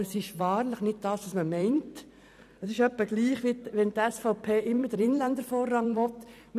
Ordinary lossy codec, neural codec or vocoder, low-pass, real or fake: none; vocoder, 44.1 kHz, 128 mel bands every 256 samples, BigVGAN v2; 14.4 kHz; fake